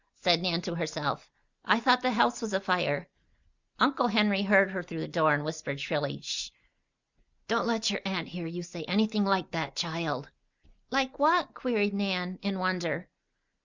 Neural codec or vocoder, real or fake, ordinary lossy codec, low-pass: none; real; Opus, 64 kbps; 7.2 kHz